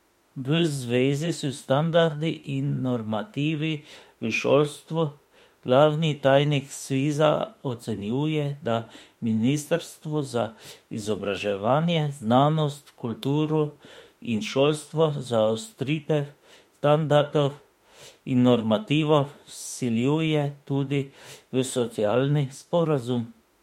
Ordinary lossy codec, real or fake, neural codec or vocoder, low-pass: MP3, 64 kbps; fake; autoencoder, 48 kHz, 32 numbers a frame, DAC-VAE, trained on Japanese speech; 19.8 kHz